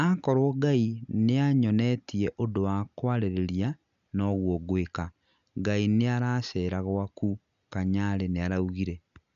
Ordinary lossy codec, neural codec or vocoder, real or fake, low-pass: none; none; real; 7.2 kHz